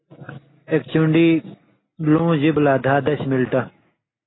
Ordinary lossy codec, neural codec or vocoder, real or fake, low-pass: AAC, 16 kbps; none; real; 7.2 kHz